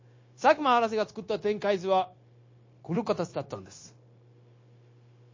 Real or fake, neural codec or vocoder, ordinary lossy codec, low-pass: fake; codec, 16 kHz, 0.9 kbps, LongCat-Audio-Codec; MP3, 32 kbps; 7.2 kHz